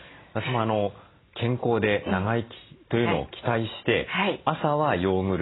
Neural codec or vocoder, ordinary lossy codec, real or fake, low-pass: none; AAC, 16 kbps; real; 7.2 kHz